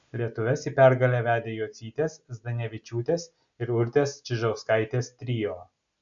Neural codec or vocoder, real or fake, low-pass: none; real; 7.2 kHz